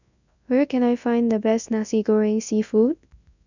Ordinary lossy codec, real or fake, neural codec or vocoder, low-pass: none; fake; codec, 24 kHz, 0.9 kbps, WavTokenizer, large speech release; 7.2 kHz